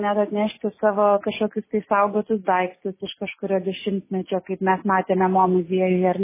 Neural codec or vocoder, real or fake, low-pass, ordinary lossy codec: none; real; 3.6 kHz; MP3, 16 kbps